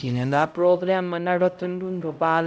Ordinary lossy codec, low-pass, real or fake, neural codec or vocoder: none; none; fake; codec, 16 kHz, 0.5 kbps, X-Codec, HuBERT features, trained on LibriSpeech